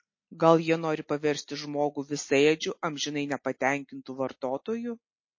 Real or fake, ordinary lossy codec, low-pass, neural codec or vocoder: real; MP3, 32 kbps; 7.2 kHz; none